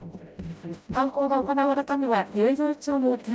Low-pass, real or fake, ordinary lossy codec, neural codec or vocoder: none; fake; none; codec, 16 kHz, 0.5 kbps, FreqCodec, smaller model